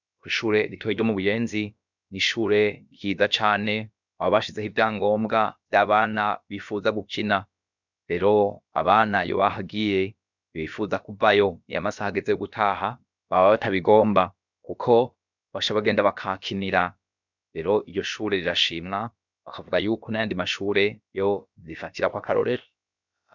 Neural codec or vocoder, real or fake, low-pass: codec, 16 kHz, about 1 kbps, DyCAST, with the encoder's durations; fake; 7.2 kHz